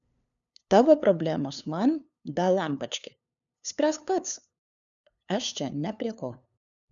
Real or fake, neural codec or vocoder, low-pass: fake; codec, 16 kHz, 2 kbps, FunCodec, trained on LibriTTS, 25 frames a second; 7.2 kHz